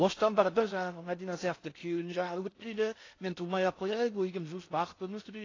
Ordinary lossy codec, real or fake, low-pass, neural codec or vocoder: AAC, 32 kbps; fake; 7.2 kHz; codec, 16 kHz in and 24 kHz out, 0.6 kbps, FocalCodec, streaming, 4096 codes